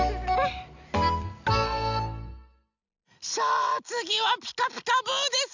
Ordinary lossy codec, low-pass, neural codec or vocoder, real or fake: none; 7.2 kHz; none; real